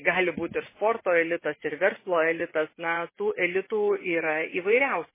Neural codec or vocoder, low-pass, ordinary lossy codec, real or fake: vocoder, 24 kHz, 100 mel bands, Vocos; 3.6 kHz; MP3, 16 kbps; fake